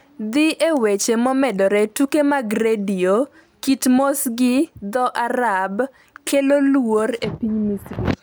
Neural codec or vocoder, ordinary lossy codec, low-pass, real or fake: none; none; none; real